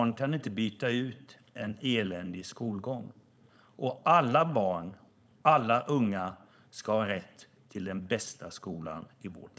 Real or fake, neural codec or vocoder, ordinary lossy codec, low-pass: fake; codec, 16 kHz, 4.8 kbps, FACodec; none; none